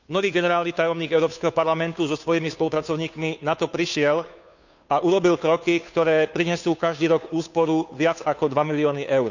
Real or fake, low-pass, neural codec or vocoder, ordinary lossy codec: fake; 7.2 kHz; codec, 16 kHz, 2 kbps, FunCodec, trained on Chinese and English, 25 frames a second; none